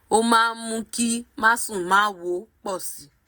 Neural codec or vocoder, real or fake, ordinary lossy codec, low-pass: none; real; none; none